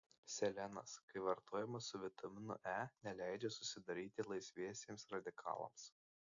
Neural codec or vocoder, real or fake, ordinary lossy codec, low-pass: none; real; AAC, 64 kbps; 7.2 kHz